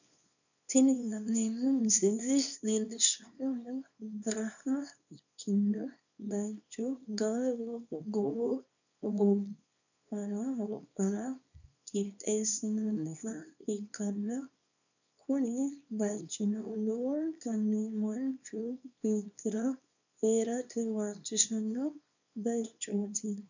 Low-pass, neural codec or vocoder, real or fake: 7.2 kHz; codec, 24 kHz, 0.9 kbps, WavTokenizer, small release; fake